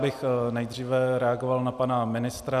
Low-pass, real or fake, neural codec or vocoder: 14.4 kHz; real; none